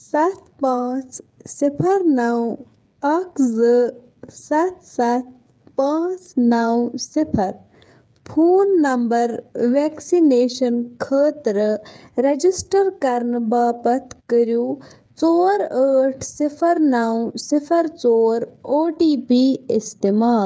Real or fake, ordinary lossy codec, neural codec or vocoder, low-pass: fake; none; codec, 16 kHz, 8 kbps, FreqCodec, smaller model; none